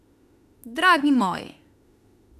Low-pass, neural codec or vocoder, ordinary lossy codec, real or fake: 14.4 kHz; autoencoder, 48 kHz, 32 numbers a frame, DAC-VAE, trained on Japanese speech; none; fake